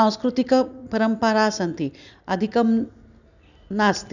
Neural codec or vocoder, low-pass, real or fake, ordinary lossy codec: none; 7.2 kHz; real; none